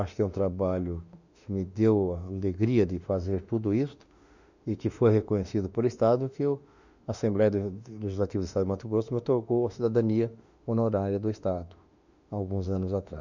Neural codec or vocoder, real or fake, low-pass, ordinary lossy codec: autoencoder, 48 kHz, 32 numbers a frame, DAC-VAE, trained on Japanese speech; fake; 7.2 kHz; none